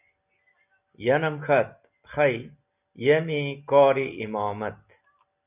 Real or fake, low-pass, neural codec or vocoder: real; 3.6 kHz; none